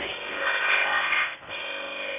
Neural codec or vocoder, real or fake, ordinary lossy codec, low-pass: codec, 16 kHz in and 24 kHz out, 0.6 kbps, FocalCodec, streaming, 2048 codes; fake; none; 3.6 kHz